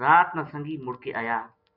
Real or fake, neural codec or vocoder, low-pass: real; none; 5.4 kHz